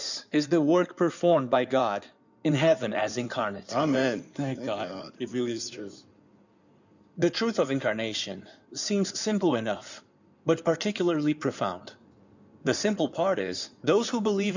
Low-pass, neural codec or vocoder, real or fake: 7.2 kHz; codec, 16 kHz in and 24 kHz out, 2.2 kbps, FireRedTTS-2 codec; fake